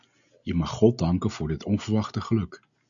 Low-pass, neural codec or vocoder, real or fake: 7.2 kHz; none; real